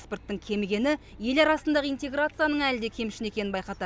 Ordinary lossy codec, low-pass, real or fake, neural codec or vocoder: none; none; real; none